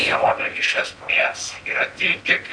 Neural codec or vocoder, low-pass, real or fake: codec, 16 kHz in and 24 kHz out, 0.8 kbps, FocalCodec, streaming, 65536 codes; 9.9 kHz; fake